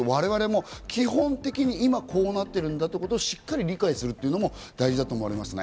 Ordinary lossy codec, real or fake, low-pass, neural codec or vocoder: none; real; none; none